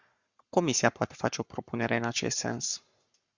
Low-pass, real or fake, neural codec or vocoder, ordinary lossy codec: 7.2 kHz; fake; codec, 44.1 kHz, 7.8 kbps, Pupu-Codec; Opus, 64 kbps